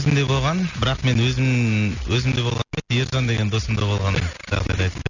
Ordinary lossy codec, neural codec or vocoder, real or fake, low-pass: none; none; real; 7.2 kHz